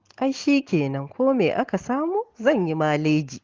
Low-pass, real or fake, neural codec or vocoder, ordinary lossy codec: 7.2 kHz; real; none; Opus, 16 kbps